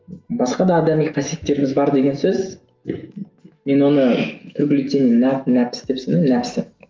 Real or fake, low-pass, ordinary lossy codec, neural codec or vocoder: fake; 7.2 kHz; Opus, 32 kbps; autoencoder, 48 kHz, 128 numbers a frame, DAC-VAE, trained on Japanese speech